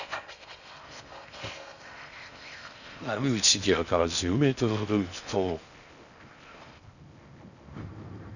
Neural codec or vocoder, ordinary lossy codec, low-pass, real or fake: codec, 16 kHz in and 24 kHz out, 0.6 kbps, FocalCodec, streaming, 4096 codes; none; 7.2 kHz; fake